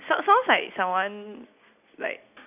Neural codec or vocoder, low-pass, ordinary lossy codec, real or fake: none; 3.6 kHz; none; real